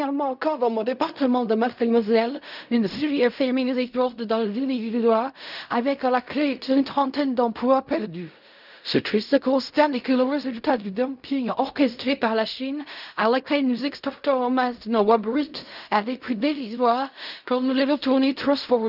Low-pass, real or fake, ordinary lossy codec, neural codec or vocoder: 5.4 kHz; fake; none; codec, 16 kHz in and 24 kHz out, 0.4 kbps, LongCat-Audio-Codec, fine tuned four codebook decoder